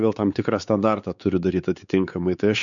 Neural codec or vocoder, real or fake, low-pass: codec, 16 kHz, 4 kbps, X-Codec, WavLM features, trained on Multilingual LibriSpeech; fake; 7.2 kHz